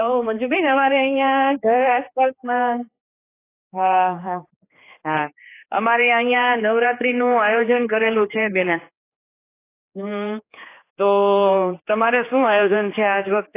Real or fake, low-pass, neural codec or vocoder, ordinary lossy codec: fake; 3.6 kHz; codec, 16 kHz, 4 kbps, X-Codec, HuBERT features, trained on general audio; AAC, 24 kbps